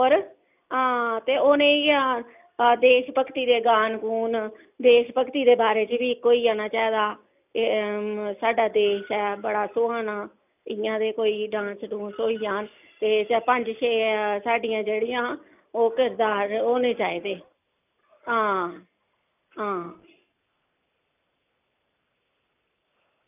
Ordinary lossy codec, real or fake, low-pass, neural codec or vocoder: none; real; 3.6 kHz; none